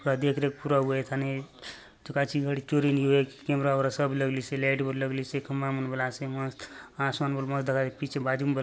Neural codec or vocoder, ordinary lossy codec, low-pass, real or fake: none; none; none; real